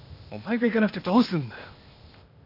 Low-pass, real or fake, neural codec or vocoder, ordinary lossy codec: 5.4 kHz; fake; codec, 16 kHz, 0.8 kbps, ZipCodec; none